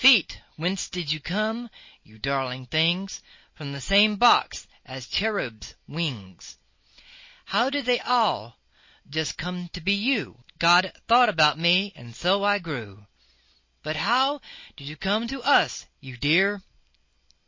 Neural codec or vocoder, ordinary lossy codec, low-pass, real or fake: none; MP3, 32 kbps; 7.2 kHz; real